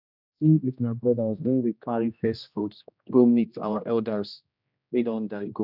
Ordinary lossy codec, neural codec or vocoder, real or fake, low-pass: none; codec, 16 kHz, 1 kbps, X-Codec, HuBERT features, trained on general audio; fake; 5.4 kHz